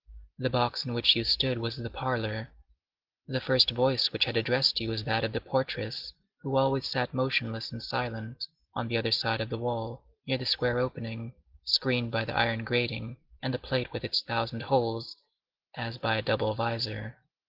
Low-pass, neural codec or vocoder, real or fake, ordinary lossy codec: 5.4 kHz; none; real; Opus, 16 kbps